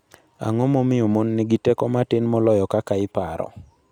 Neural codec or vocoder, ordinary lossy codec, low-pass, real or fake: none; none; 19.8 kHz; real